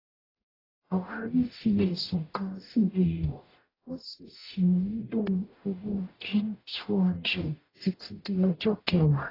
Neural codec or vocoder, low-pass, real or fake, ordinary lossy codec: codec, 44.1 kHz, 0.9 kbps, DAC; 5.4 kHz; fake; AAC, 24 kbps